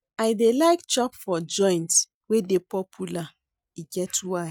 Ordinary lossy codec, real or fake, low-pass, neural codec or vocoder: none; real; 19.8 kHz; none